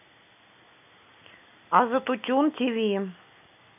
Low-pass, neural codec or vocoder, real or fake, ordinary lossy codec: 3.6 kHz; none; real; none